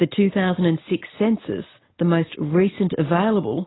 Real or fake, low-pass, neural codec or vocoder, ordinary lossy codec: real; 7.2 kHz; none; AAC, 16 kbps